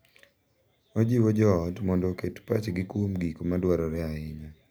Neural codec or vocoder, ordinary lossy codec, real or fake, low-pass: none; none; real; none